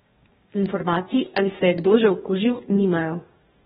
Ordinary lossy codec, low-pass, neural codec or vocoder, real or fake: AAC, 16 kbps; 19.8 kHz; codec, 44.1 kHz, 2.6 kbps, DAC; fake